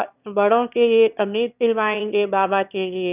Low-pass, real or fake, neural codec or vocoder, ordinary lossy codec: 3.6 kHz; fake; autoencoder, 22.05 kHz, a latent of 192 numbers a frame, VITS, trained on one speaker; none